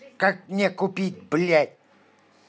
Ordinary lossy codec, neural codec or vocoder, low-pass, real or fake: none; none; none; real